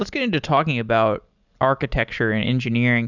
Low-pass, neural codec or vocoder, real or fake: 7.2 kHz; none; real